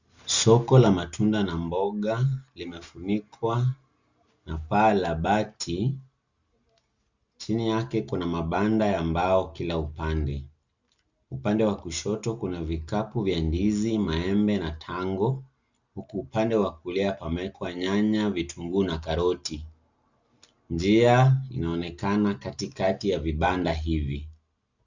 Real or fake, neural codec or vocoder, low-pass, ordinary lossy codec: real; none; 7.2 kHz; Opus, 64 kbps